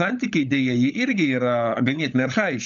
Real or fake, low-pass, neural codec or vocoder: fake; 7.2 kHz; codec, 16 kHz, 16 kbps, FunCodec, trained on LibriTTS, 50 frames a second